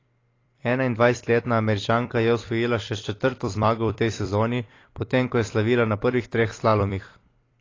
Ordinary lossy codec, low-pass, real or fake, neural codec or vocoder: AAC, 32 kbps; 7.2 kHz; fake; vocoder, 44.1 kHz, 128 mel bands every 256 samples, BigVGAN v2